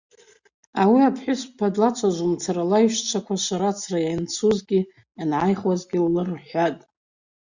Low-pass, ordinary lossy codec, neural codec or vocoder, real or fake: 7.2 kHz; Opus, 64 kbps; none; real